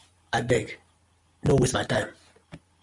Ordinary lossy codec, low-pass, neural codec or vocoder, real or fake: Opus, 64 kbps; 10.8 kHz; none; real